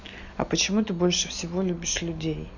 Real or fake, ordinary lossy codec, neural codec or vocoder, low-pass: real; none; none; 7.2 kHz